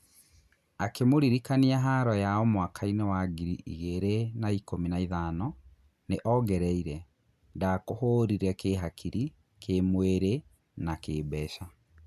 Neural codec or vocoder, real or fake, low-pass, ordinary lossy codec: none; real; 14.4 kHz; none